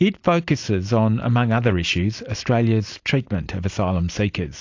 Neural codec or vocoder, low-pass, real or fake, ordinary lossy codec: vocoder, 44.1 kHz, 128 mel bands every 256 samples, BigVGAN v2; 7.2 kHz; fake; AAC, 48 kbps